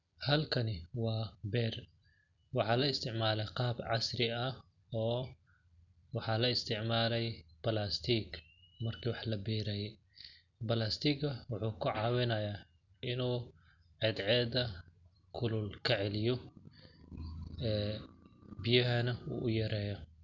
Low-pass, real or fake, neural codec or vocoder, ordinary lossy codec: 7.2 kHz; real; none; none